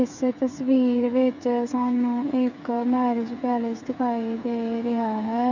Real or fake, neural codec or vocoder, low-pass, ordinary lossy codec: fake; codec, 16 kHz, 8 kbps, FreqCodec, smaller model; 7.2 kHz; none